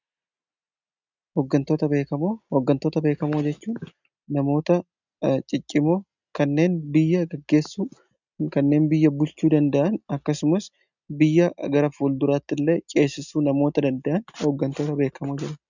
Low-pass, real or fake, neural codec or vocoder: 7.2 kHz; real; none